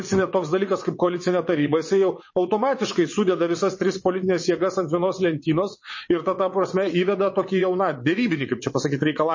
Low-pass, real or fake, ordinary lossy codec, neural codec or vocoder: 7.2 kHz; fake; MP3, 32 kbps; vocoder, 22.05 kHz, 80 mel bands, WaveNeXt